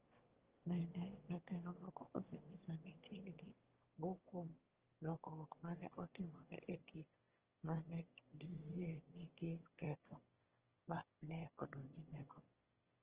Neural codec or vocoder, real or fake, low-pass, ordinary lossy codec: autoencoder, 22.05 kHz, a latent of 192 numbers a frame, VITS, trained on one speaker; fake; 3.6 kHz; Opus, 16 kbps